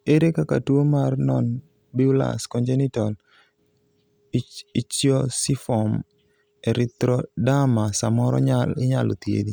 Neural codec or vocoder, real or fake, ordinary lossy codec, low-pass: none; real; none; none